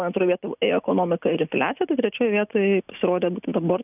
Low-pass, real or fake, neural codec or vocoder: 3.6 kHz; real; none